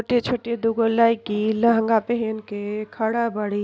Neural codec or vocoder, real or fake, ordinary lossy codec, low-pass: none; real; none; none